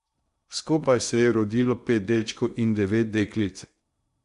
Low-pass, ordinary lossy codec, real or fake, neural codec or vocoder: 10.8 kHz; Opus, 64 kbps; fake; codec, 16 kHz in and 24 kHz out, 0.8 kbps, FocalCodec, streaming, 65536 codes